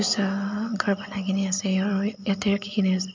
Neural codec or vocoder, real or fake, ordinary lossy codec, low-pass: none; real; MP3, 64 kbps; 7.2 kHz